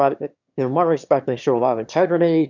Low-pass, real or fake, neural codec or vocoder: 7.2 kHz; fake; autoencoder, 22.05 kHz, a latent of 192 numbers a frame, VITS, trained on one speaker